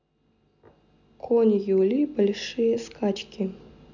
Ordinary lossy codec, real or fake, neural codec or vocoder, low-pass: none; real; none; 7.2 kHz